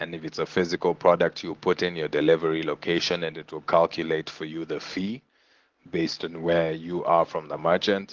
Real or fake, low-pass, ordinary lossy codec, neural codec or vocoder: real; 7.2 kHz; Opus, 16 kbps; none